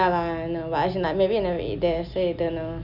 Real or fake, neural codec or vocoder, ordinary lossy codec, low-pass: real; none; none; 5.4 kHz